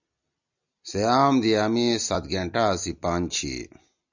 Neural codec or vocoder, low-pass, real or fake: none; 7.2 kHz; real